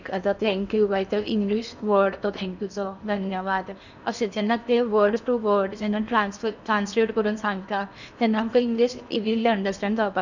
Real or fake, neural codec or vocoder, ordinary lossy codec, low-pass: fake; codec, 16 kHz in and 24 kHz out, 0.8 kbps, FocalCodec, streaming, 65536 codes; none; 7.2 kHz